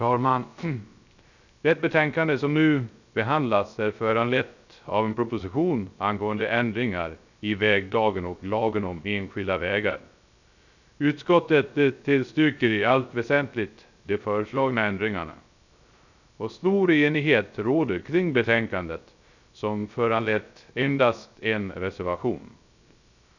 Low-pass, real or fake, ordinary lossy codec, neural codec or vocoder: 7.2 kHz; fake; none; codec, 16 kHz, 0.3 kbps, FocalCodec